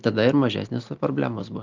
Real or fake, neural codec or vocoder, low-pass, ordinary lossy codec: real; none; 7.2 kHz; Opus, 32 kbps